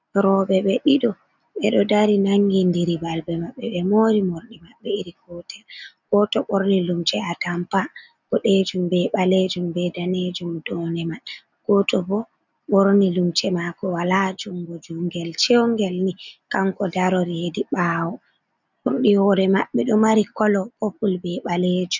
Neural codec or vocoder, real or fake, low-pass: none; real; 7.2 kHz